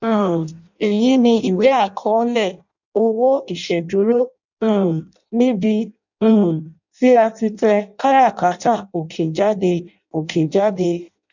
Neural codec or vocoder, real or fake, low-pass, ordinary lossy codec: codec, 16 kHz in and 24 kHz out, 0.6 kbps, FireRedTTS-2 codec; fake; 7.2 kHz; none